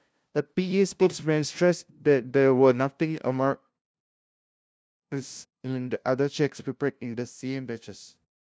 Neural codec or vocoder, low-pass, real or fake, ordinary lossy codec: codec, 16 kHz, 0.5 kbps, FunCodec, trained on LibriTTS, 25 frames a second; none; fake; none